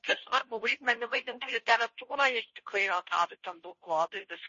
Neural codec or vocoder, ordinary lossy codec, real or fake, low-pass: codec, 16 kHz, 0.5 kbps, FunCodec, trained on Chinese and English, 25 frames a second; MP3, 32 kbps; fake; 7.2 kHz